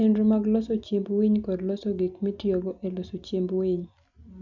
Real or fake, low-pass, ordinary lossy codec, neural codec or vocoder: real; 7.2 kHz; none; none